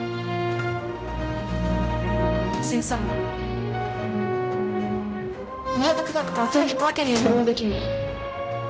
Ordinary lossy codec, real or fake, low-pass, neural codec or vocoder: none; fake; none; codec, 16 kHz, 0.5 kbps, X-Codec, HuBERT features, trained on general audio